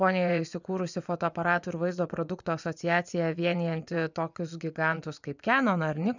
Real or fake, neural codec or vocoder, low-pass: fake; vocoder, 24 kHz, 100 mel bands, Vocos; 7.2 kHz